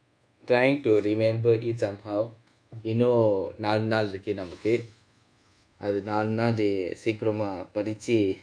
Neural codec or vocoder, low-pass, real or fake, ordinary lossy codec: codec, 24 kHz, 1.2 kbps, DualCodec; 9.9 kHz; fake; none